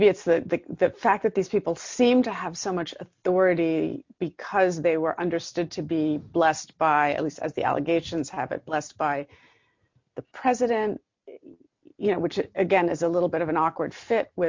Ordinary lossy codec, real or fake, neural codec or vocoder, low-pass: MP3, 48 kbps; real; none; 7.2 kHz